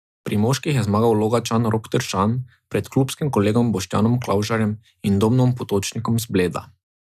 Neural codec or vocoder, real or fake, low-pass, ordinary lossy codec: none; real; 14.4 kHz; none